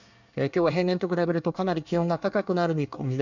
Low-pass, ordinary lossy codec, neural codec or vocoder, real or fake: 7.2 kHz; none; codec, 24 kHz, 1 kbps, SNAC; fake